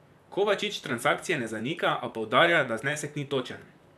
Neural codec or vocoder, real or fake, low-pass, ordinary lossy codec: vocoder, 44.1 kHz, 128 mel bands, Pupu-Vocoder; fake; 14.4 kHz; none